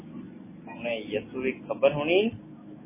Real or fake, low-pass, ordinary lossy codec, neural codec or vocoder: real; 3.6 kHz; MP3, 16 kbps; none